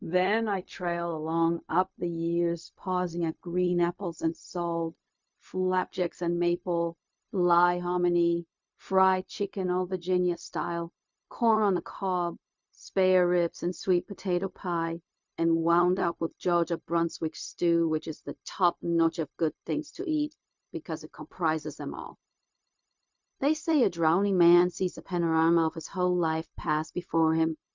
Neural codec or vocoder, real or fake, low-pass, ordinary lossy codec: codec, 16 kHz, 0.4 kbps, LongCat-Audio-Codec; fake; 7.2 kHz; MP3, 64 kbps